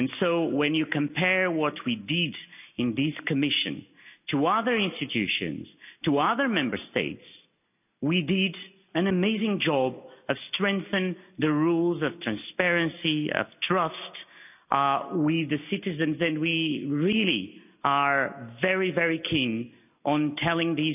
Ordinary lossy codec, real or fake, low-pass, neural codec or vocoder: none; real; 3.6 kHz; none